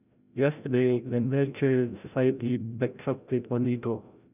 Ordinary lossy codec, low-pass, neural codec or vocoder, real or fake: none; 3.6 kHz; codec, 16 kHz, 0.5 kbps, FreqCodec, larger model; fake